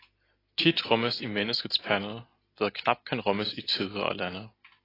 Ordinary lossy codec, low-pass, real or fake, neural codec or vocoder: AAC, 24 kbps; 5.4 kHz; real; none